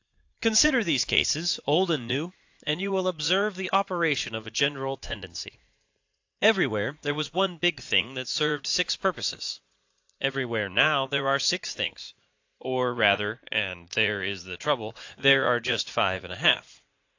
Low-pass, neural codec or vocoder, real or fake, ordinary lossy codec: 7.2 kHz; vocoder, 44.1 kHz, 80 mel bands, Vocos; fake; AAC, 48 kbps